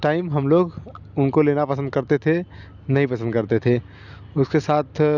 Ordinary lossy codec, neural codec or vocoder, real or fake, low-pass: none; none; real; 7.2 kHz